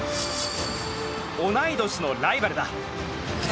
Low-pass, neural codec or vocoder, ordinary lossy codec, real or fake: none; none; none; real